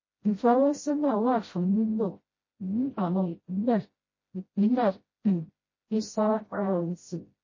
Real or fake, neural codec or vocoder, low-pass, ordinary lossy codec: fake; codec, 16 kHz, 0.5 kbps, FreqCodec, smaller model; 7.2 kHz; MP3, 32 kbps